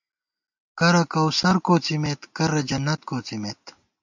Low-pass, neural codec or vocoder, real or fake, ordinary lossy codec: 7.2 kHz; none; real; MP3, 48 kbps